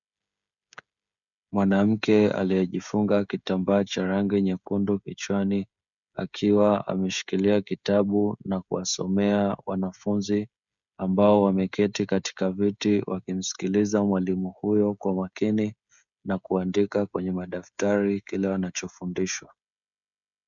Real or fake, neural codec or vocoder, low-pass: fake; codec, 16 kHz, 16 kbps, FreqCodec, smaller model; 7.2 kHz